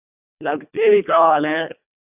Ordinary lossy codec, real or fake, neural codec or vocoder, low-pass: none; fake; codec, 24 kHz, 1.5 kbps, HILCodec; 3.6 kHz